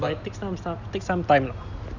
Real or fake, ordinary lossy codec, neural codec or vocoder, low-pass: real; none; none; 7.2 kHz